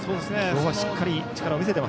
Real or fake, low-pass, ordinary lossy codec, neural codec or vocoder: real; none; none; none